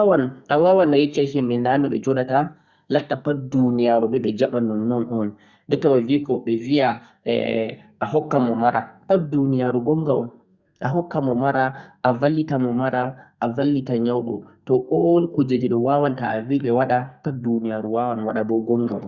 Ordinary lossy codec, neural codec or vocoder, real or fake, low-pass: Opus, 64 kbps; codec, 32 kHz, 1.9 kbps, SNAC; fake; 7.2 kHz